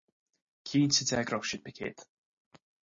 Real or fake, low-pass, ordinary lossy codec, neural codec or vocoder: real; 7.2 kHz; MP3, 32 kbps; none